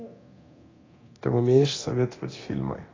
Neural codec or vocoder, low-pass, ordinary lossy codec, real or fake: codec, 24 kHz, 0.9 kbps, DualCodec; 7.2 kHz; AAC, 32 kbps; fake